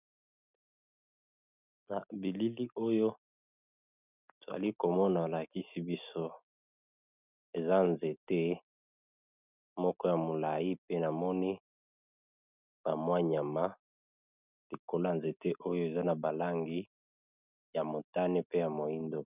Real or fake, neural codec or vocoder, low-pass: real; none; 3.6 kHz